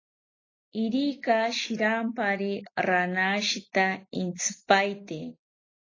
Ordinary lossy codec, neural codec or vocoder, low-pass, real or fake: AAC, 32 kbps; none; 7.2 kHz; real